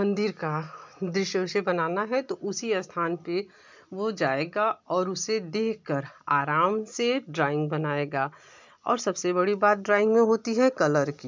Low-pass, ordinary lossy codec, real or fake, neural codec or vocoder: 7.2 kHz; MP3, 64 kbps; real; none